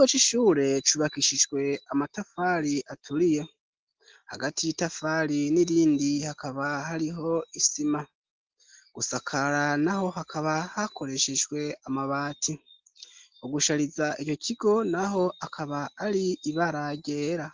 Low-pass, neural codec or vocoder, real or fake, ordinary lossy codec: 7.2 kHz; none; real; Opus, 16 kbps